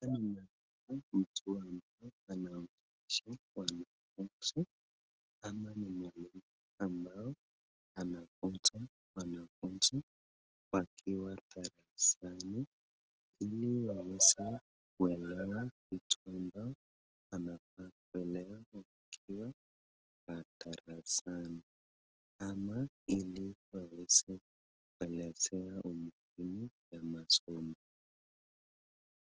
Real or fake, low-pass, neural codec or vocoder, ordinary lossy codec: real; 7.2 kHz; none; Opus, 16 kbps